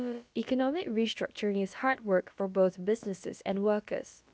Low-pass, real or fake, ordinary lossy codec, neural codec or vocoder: none; fake; none; codec, 16 kHz, about 1 kbps, DyCAST, with the encoder's durations